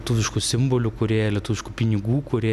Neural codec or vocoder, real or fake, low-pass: none; real; 14.4 kHz